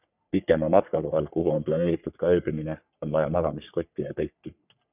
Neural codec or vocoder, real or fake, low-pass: codec, 44.1 kHz, 3.4 kbps, Pupu-Codec; fake; 3.6 kHz